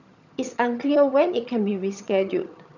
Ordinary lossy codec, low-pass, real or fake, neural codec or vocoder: none; 7.2 kHz; fake; vocoder, 22.05 kHz, 80 mel bands, HiFi-GAN